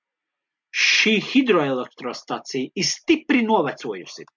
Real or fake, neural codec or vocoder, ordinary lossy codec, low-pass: real; none; MP3, 64 kbps; 7.2 kHz